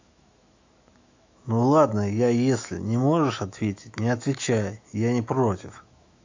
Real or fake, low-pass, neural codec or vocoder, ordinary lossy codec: fake; 7.2 kHz; autoencoder, 48 kHz, 128 numbers a frame, DAC-VAE, trained on Japanese speech; AAC, 48 kbps